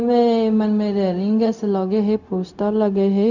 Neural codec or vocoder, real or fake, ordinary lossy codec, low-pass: codec, 16 kHz, 0.4 kbps, LongCat-Audio-Codec; fake; none; 7.2 kHz